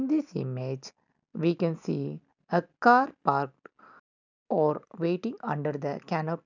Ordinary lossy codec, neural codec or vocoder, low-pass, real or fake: none; none; 7.2 kHz; real